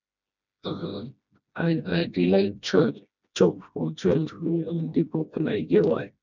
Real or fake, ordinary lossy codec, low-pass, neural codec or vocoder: fake; none; 7.2 kHz; codec, 16 kHz, 1 kbps, FreqCodec, smaller model